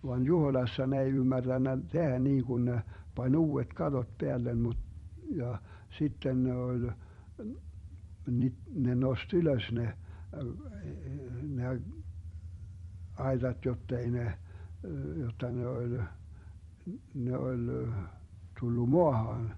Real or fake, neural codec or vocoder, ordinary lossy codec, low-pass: fake; vocoder, 44.1 kHz, 128 mel bands every 256 samples, BigVGAN v2; MP3, 48 kbps; 19.8 kHz